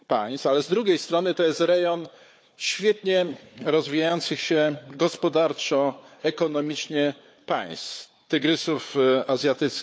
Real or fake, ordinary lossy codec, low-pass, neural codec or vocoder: fake; none; none; codec, 16 kHz, 4 kbps, FunCodec, trained on Chinese and English, 50 frames a second